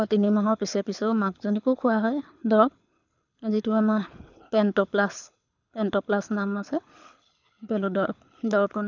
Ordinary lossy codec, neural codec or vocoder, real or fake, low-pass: none; codec, 24 kHz, 6 kbps, HILCodec; fake; 7.2 kHz